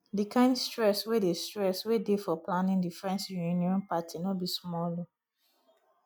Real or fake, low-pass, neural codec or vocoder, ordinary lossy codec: real; none; none; none